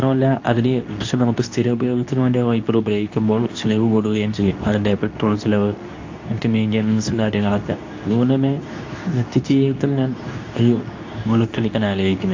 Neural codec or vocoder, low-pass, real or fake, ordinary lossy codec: codec, 24 kHz, 0.9 kbps, WavTokenizer, medium speech release version 2; 7.2 kHz; fake; AAC, 48 kbps